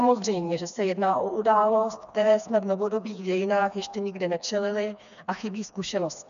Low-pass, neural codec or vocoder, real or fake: 7.2 kHz; codec, 16 kHz, 2 kbps, FreqCodec, smaller model; fake